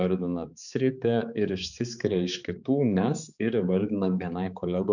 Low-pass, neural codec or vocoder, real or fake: 7.2 kHz; codec, 16 kHz, 4 kbps, X-Codec, HuBERT features, trained on balanced general audio; fake